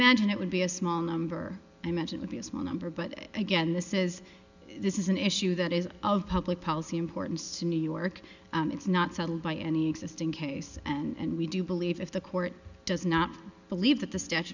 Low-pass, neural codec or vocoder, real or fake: 7.2 kHz; none; real